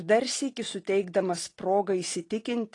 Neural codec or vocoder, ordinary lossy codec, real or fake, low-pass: none; AAC, 32 kbps; real; 10.8 kHz